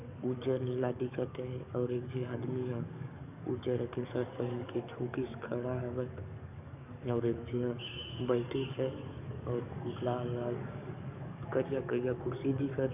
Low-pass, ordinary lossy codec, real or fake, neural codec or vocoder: 3.6 kHz; none; fake; codec, 44.1 kHz, 7.8 kbps, DAC